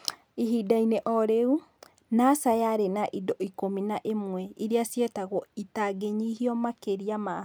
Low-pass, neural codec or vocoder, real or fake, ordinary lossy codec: none; none; real; none